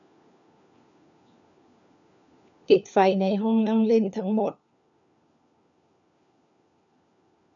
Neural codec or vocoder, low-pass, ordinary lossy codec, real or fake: codec, 16 kHz, 4 kbps, FunCodec, trained on LibriTTS, 50 frames a second; 7.2 kHz; none; fake